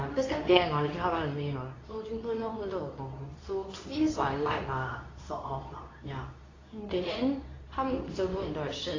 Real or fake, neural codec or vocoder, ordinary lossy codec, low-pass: fake; codec, 24 kHz, 0.9 kbps, WavTokenizer, medium speech release version 2; AAC, 32 kbps; 7.2 kHz